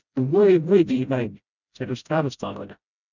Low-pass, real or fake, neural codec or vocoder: 7.2 kHz; fake; codec, 16 kHz, 0.5 kbps, FreqCodec, smaller model